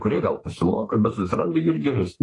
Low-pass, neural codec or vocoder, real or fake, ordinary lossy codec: 10.8 kHz; autoencoder, 48 kHz, 32 numbers a frame, DAC-VAE, trained on Japanese speech; fake; AAC, 32 kbps